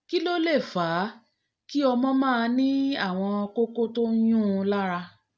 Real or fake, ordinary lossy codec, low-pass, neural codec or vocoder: real; none; none; none